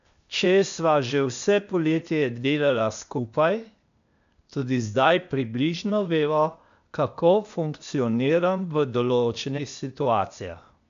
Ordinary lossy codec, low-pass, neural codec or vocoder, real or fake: MP3, 64 kbps; 7.2 kHz; codec, 16 kHz, 0.8 kbps, ZipCodec; fake